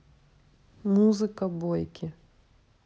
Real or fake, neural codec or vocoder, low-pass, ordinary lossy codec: real; none; none; none